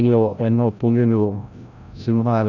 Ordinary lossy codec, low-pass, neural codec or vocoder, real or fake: none; 7.2 kHz; codec, 16 kHz, 0.5 kbps, FreqCodec, larger model; fake